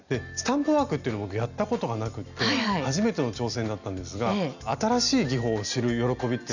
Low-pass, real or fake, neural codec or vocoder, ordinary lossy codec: 7.2 kHz; real; none; none